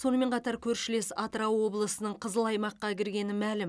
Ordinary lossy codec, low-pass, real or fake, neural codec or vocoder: none; none; real; none